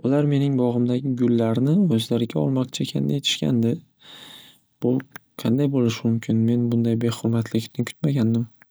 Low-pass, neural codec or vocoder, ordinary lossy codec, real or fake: none; none; none; real